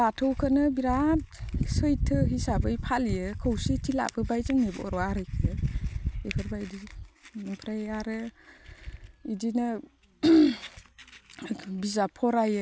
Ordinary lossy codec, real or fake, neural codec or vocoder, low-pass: none; real; none; none